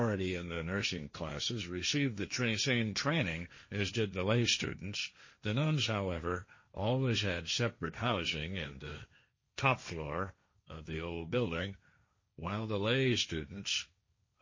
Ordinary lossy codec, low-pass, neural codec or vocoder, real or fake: MP3, 32 kbps; 7.2 kHz; codec, 16 kHz, 1.1 kbps, Voila-Tokenizer; fake